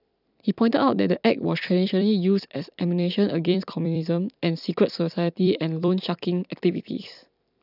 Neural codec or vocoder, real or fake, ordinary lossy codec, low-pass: vocoder, 44.1 kHz, 128 mel bands every 256 samples, BigVGAN v2; fake; none; 5.4 kHz